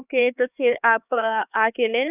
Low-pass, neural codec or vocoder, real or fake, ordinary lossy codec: 3.6 kHz; codec, 16 kHz, 4 kbps, X-Codec, HuBERT features, trained on LibriSpeech; fake; none